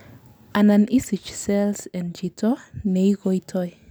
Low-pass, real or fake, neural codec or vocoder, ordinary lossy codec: none; real; none; none